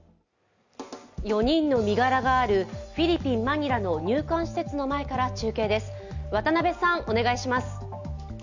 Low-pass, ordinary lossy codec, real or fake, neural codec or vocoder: 7.2 kHz; none; real; none